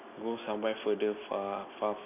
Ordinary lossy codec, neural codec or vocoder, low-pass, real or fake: none; none; 3.6 kHz; real